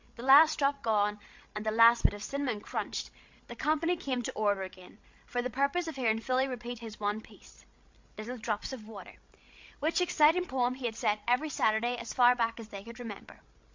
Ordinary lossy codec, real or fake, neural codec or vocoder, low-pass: MP3, 48 kbps; fake; codec, 16 kHz, 16 kbps, FreqCodec, larger model; 7.2 kHz